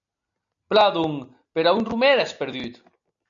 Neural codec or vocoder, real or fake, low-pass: none; real; 7.2 kHz